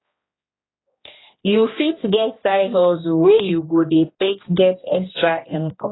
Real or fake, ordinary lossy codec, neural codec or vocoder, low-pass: fake; AAC, 16 kbps; codec, 16 kHz, 1 kbps, X-Codec, HuBERT features, trained on general audio; 7.2 kHz